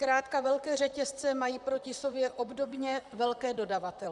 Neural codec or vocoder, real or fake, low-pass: vocoder, 44.1 kHz, 128 mel bands, Pupu-Vocoder; fake; 10.8 kHz